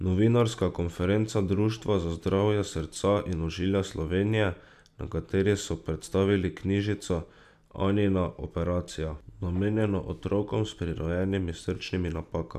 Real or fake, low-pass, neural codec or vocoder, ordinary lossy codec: real; 14.4 kHz; none; none